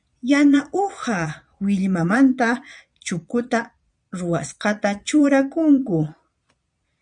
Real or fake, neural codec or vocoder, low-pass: fake; vocoder, 22.05 kHz, 80 mel bands, Vocos; 9.9 kHz